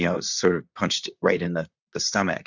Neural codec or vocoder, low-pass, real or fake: vocoder, 44.1 kHz, 128 mel bands, Pupu-Vocoder; 7.2 kHz; fake